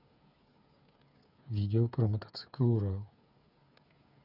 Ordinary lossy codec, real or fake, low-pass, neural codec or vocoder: none; fake; 5.4 kHz; codec, 16 kHz, 8 kbps, FreqCodec, smaller model